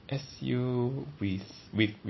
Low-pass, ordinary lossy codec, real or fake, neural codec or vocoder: 7.2 kHz; MP3, 24 kbps; real; none